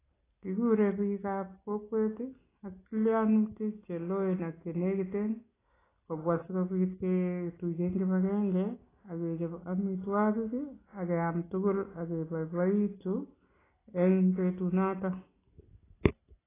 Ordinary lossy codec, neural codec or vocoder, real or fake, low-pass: AAC, 16 kbps; none; real; 3.6 kHz